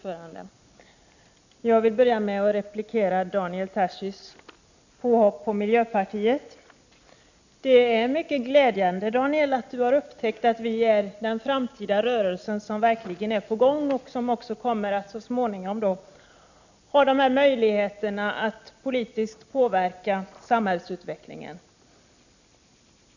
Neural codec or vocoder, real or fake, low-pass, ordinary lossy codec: none; real; 7.2 kHz; none